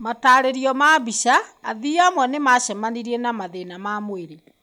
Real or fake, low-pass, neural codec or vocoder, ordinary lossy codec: real; none; none; none